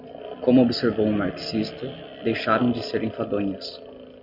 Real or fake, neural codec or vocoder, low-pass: real; none; 5.4 kHz